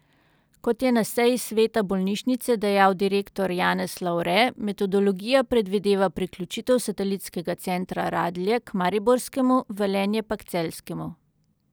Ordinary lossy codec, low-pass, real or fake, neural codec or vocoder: none; none; real; none